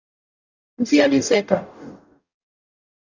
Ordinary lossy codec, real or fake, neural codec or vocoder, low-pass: AAC, 48 kbps; fake; codec, 44.1 kHz, 0.9 kbps, DAC; 7.2 kHz